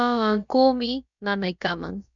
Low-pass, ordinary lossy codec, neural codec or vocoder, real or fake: 7.2 kHz; MP3, 96 kbps; codec, 16 kHz, about 1 kbps, DyCAST, with the encoder's durations; fake